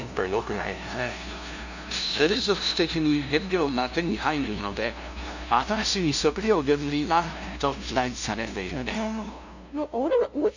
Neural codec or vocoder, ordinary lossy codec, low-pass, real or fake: codec, 16 kHz, 0.5 kbps, FunCodec, trained on LibriTTS, 25 frames a second; none; 7.2 kHz; fake